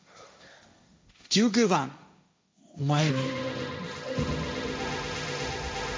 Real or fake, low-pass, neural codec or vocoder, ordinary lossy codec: fake; none; codec, 16 kHz, 1.1 kbps, Voila-Tokenizer; none